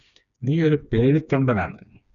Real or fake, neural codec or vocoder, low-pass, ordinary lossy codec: fake; codec, 16 kHz, 2 kbps, FreqCodec, smaller model; 7.2 kHz; MP3, 96 kbps